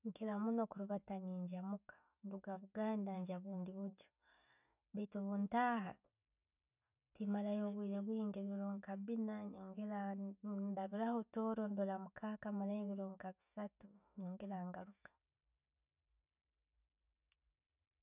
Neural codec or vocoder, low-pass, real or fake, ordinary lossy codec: none; 3.6 kHz; real; none